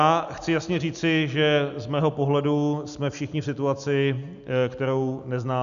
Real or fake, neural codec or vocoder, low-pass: real; none; 7.2 kHz